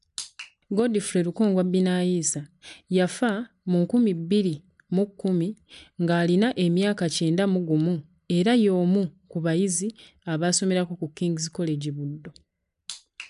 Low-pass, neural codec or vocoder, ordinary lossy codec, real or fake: 10.8 kHz; none; none; real